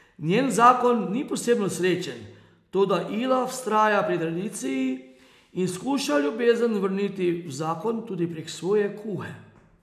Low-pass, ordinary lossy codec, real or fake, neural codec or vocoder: 14.4 kHz; AAC, 96 kbps; real; none